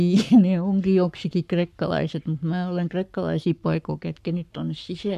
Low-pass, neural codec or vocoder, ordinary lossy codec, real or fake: 14.4 kHz; codec, 44.1 kHz, 7.8 kbps, Pupu-Codec; none; fake